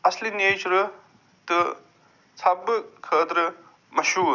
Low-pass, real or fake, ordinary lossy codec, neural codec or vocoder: 7.2 kHz; real; none; none